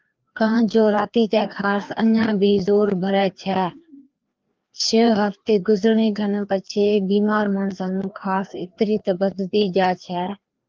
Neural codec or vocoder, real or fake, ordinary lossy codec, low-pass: codec, 16 kHz, 2 kbps, FreqCodec, larger model; fake; Opus, 32 kbps; 7.2 kHz